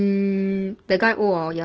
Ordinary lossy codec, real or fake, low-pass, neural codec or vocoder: Opus, 16 kbps; real; 7.2 kHz; none